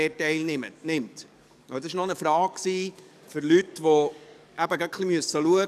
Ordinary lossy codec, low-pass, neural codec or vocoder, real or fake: none; 14.4 kHz; codec, 44.1 kHz, 7.8 kbps, DAC; fake